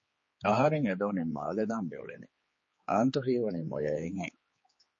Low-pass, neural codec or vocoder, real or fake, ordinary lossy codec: 7.2 kHz; codec, 16 kHz, 4 kbps, X-Codec, HuBERT features, trained on general audio; fake; MP3, 32 kbps